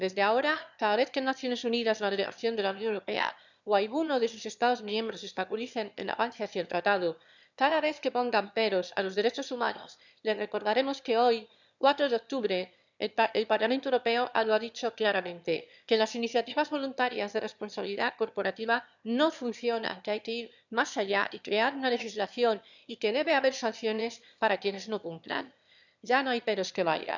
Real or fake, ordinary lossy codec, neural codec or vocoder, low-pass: fake; none; autoencoder, 22.05 kHz, a latent of 192 numbers a frame, VITS, trained on one speaker; 7.2 kHz